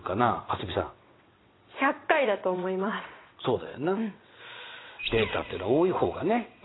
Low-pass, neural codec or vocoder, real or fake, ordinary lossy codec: 7.2 kHz; vocoder, 22.05 kHz, 80 mel bands, WaveNeXt; fake; AAC, 16 kbps